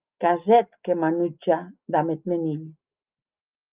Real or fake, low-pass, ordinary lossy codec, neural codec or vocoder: real; 3.6 kHz; Opus, 32 kbps; none